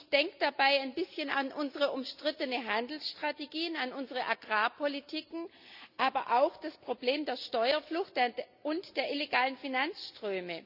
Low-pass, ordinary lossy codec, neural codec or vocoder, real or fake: 5.4 kHz; none; none; real